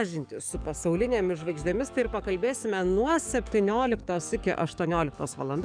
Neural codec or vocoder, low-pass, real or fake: codec, 44.1 kHz, 7.8 kbps, DAC; 9.9 kHz; fake